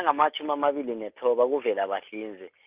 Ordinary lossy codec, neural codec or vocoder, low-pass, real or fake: Opus, 24 kbps; none; 3.6 kHz; real